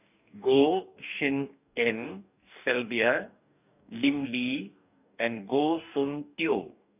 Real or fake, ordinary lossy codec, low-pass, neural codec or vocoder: fake; none; 3.6 kHz; codec, 44.1 kHz, 2.6 kbps, DAC